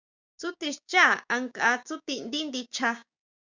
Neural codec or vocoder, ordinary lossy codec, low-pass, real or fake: codec, 16 kHz, 6 kbps, DAC; Opus, 64 kbps; 7.2 kHz; fake